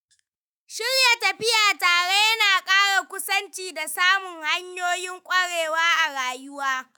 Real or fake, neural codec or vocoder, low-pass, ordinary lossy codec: fake; autoencoder, 48 kHz, 128 numbers a frame, DAC-VAE, trained on Japanese speech; none; none